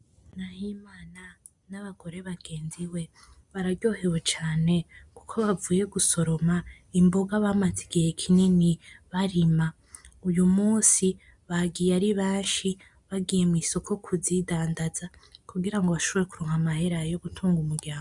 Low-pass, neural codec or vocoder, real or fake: 10.8 kHz; none; real